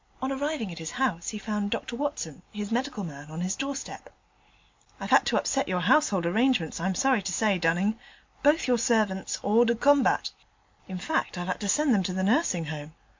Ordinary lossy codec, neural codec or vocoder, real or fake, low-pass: MP3, 64 kbps; none; real; 7.2 kHz